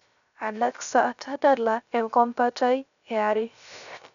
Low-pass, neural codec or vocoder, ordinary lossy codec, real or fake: 7.2 kHz; codec, 16 kHz, 0.3 kbps, FocalCodec; none; fake